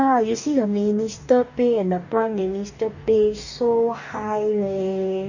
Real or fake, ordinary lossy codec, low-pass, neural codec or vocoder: fake; none; 7.2 kHz; codec, 44.1 kHz, 2.6 kbps, DAC